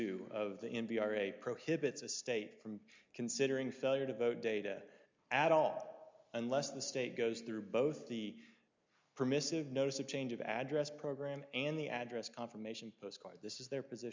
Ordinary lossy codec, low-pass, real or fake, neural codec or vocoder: MP3, 64 kbps; 7.2 kHz; real; none